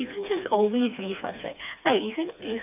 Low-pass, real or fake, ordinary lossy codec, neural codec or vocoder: 3.6 kHz; fake; none; codec, 16 kHz, 2 kbps, FreqCodec, smaller model